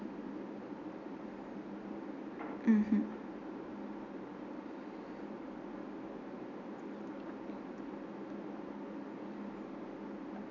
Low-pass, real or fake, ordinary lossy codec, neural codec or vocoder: 7.2 kHz; real; none; none